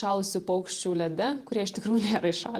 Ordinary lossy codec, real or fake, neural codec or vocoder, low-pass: Opus, 24 kbps; fake; vocoder, 48 kHz, 128 mel bands, Vocos; 14.4 kHz